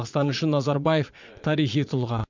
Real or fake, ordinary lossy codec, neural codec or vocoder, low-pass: fake; MP3, 64 kbps; vocoder, 22.05 kHz, 80 mel bands, Vocos; 7.2 kHz